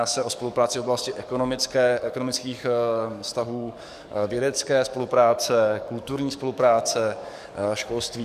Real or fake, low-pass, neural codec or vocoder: fake; 14.4 kHz; codec, 44.1 kHz, 7.8 kbps, DAC